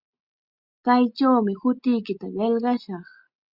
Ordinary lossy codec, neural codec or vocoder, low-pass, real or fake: Opus, 64 kbps; none; 5.4 kHz; real